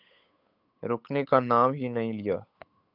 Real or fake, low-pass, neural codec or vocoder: fake; 5.4 kHz; codec, 16 kHz, 8 kbps, FunCodec, trained on Chinese and English, 25 frames a second